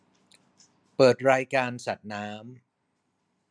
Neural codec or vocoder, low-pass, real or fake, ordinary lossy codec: none; none; real; none